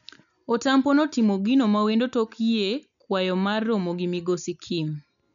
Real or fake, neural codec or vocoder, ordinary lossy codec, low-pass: real; none; none; 7.2 kHz